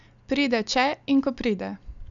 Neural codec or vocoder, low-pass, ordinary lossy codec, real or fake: none; 7.2 kHz; MP3, 96 kbps; real